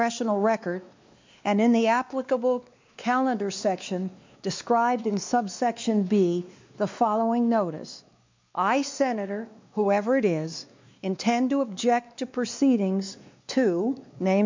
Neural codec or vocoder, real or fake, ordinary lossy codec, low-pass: codec, 16 kHz, 2 kbps, X-Codec, WavLM features, trained on Multilingual LibriSpeech; fake; MP3, 64 kbps; 7.2 kHz